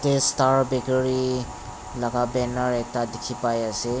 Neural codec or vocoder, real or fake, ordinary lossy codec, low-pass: none; real; none; none